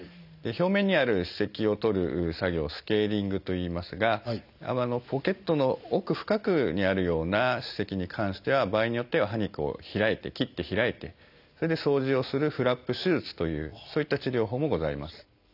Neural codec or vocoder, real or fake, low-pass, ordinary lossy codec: none; real; 5.4 kHz; none